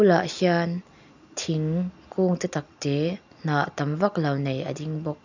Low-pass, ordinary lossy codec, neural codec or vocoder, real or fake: 7.2 kHz; none; none; real